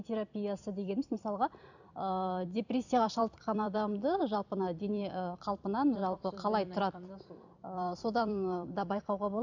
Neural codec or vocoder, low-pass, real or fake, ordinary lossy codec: vocoder, 44.1 kHz, 128 mel bands every 256 samples, BigVGAN v2; 7.2 kHz; fake; none